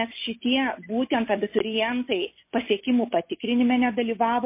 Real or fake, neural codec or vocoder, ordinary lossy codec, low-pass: real; none; MP3, 24 kbps; 3.6 kHz